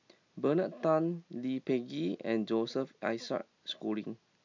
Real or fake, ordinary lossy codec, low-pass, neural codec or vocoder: real; MP3, 64 kbps; 7.2 kHz; none